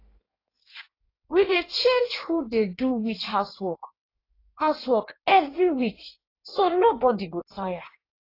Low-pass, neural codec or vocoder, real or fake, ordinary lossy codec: 5.4 kHz; codec, 16 kHz in and 24 kHz out, 1.1 kbps, FireRedTTS-2 codec; fake; AAC, 24 kbps